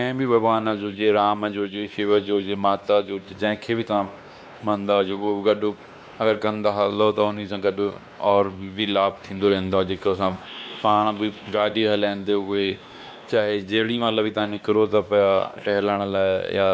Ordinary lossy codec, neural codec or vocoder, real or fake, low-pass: none; codec, 16 kHz, 2 kbps, X-Codec, WavLM features, trained on Multilingual LibriSpeech; fake; none